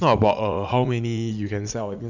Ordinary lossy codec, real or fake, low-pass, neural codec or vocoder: none; fake; 7.2 kHz; vocoder, 44.1 kHz, 80 mel bands, Vocos